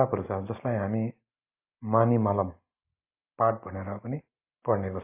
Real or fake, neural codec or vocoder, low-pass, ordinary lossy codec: real; none; 3.6 kHz; AAC, 24 kbps